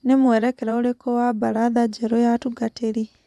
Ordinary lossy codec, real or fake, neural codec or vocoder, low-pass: none; fake; vocoder, 24 kHz, 100 mel bands, Vocos; none